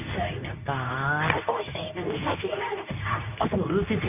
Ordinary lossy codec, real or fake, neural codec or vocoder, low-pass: none; fake; codec, 24 kHz, 0.9 kbps, WavTokenizer, medium speech release version 2; 3.6 kHz